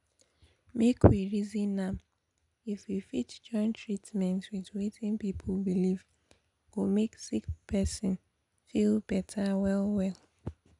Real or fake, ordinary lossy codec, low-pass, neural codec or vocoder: real; none; 10.8 kHz; none